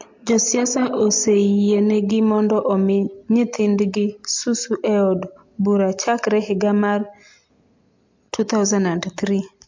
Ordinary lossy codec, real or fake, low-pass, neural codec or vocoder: MP3, 48 kbps; real; 7.2 kHz; none